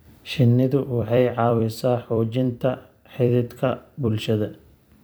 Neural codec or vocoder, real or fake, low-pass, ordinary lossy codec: vocoder, 44.1 kHz, 128 mel bands every 256 samples, BigVGAN v2; fake; none; none